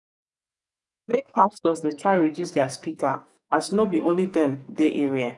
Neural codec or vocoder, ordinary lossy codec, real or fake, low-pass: codec, 44.1 kHz, 2.6 kbps, SNAC; none; fake; 10.8 kHz